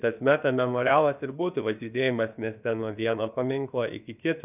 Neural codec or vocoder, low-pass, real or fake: codec, 16 kHz, about 1 kbps, DyCAST, with the encoder's durations; 3.6 kHz; fake